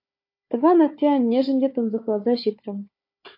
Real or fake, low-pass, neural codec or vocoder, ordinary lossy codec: fake; 5.4 kHz; codec, 16 kHz, 4 kbps, FunCodec, trained on Chinese and English, 50 frames a second; MP3, 32 kbps